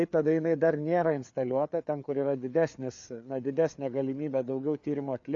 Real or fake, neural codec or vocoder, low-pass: fake; codec, 16 kHz, 4 kbps, FreqCodec, larger model; 7.2 kHz